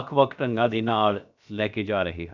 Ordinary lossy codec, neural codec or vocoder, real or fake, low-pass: none; codec, 16 kHz, about 1 kbps, DyCAST, with the encoder's durations; fake; 7.2 kHz